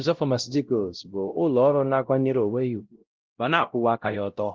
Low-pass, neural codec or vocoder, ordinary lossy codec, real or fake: 7.2 kHz; codec, 16 kHz, 0.5 kbps, X-Codec, WavLM features, trained on Multilingual LibriSpeech; Opus, 16 kbps; fake